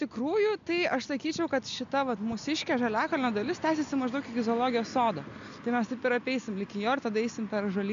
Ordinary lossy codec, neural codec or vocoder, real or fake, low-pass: AAC, 64 kbps; none; real; 7.2 kHz